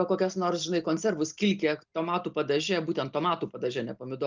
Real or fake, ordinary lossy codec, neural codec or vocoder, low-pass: real; Opus, 32 kbps; none; 7.2 kHz